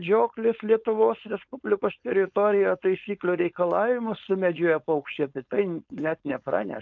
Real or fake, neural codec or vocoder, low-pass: fake; codec, 16 kHz, 4.8 kbps, FACodec; 7.2 kHz